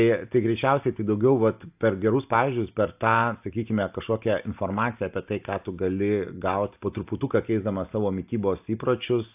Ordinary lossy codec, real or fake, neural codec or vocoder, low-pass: AAC, 32 kbps; real; none; 3.6 kHz